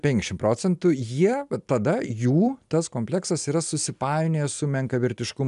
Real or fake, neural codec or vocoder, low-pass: real; none; 10.8 kHz